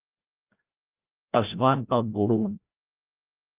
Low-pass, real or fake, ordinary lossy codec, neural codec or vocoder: 3.6 kHz; fake; Opus, 32 kbps; codec, 16 kHz, 0.5 kbps, FreqCodec, larger model